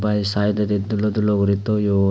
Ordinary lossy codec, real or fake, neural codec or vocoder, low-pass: none; real; none; none